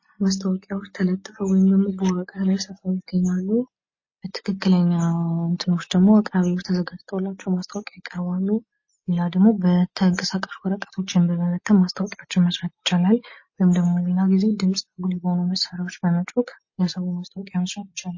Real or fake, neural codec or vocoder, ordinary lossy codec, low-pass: real; none; MP3, 32 kbps; 7.2 kHz